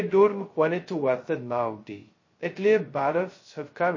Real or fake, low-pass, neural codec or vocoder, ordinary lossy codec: fake; 7.2 kHz; codec, 16 kHz, 0.2 kbps, FocalCodec; MP3, 32 kbps